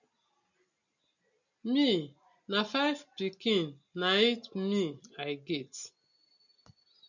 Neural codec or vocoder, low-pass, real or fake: none; 7.2 kHz; real